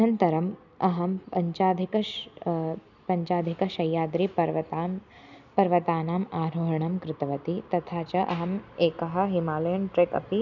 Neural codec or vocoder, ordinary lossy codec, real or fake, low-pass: none; none; real; 7.2 kHz